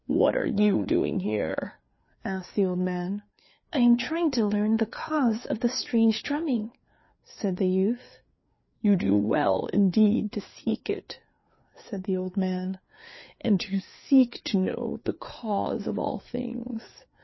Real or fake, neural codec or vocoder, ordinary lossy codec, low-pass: fake; codec, 16 kHz, 4 kbps, FreqCodec, larger model; MP3, 24 kbps; 7.2 kHz